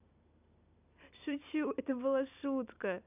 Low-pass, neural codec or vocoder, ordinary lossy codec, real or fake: 3.6 kHz; none; AAC, 32 kbps; real